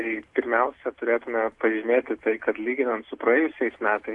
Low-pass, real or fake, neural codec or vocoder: 10.8 kHz; real; none